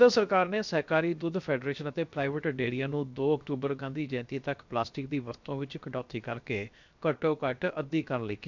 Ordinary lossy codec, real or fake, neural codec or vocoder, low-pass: MP3, 64 kbps; fake; codec, 16 kHz, 0.7 kbps, FocalCodec; 7.2 kHz